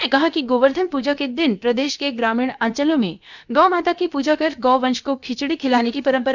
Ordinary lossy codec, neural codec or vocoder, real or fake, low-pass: none; codec, 16 kHz, about 1 kbps, DyCAST, with the encoder's durations; fake; 7.2 kHz